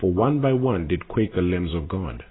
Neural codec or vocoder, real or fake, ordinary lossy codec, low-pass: none; real; AAC, 16 kbps; 7.2 kHz